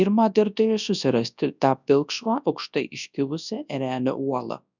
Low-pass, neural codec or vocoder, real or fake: 7.2 kHz; codec, 24 kHz, 0.9 kbps, WavTokenizer, large speech release; fake